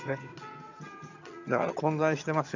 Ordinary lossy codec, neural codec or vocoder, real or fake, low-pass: none; vocoder, 22.05 kHz, 80 mel bands, HiFi-GAN; fake; 7.2 kHz